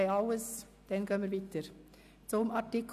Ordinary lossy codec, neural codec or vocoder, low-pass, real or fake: none; none; 14.4 kHz; real